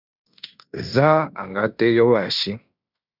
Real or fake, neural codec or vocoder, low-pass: fake; codec, 16 kHz in and 24 kHz out, 0.9 kbps, LongCat-Audio-Codec, fine tuned four codebook decoder; 5.4 kHz